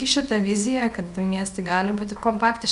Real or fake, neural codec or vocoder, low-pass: fake; codec, 24 kHz, 0.9 kbps, WavTokenizer, small release; 10.8 kHz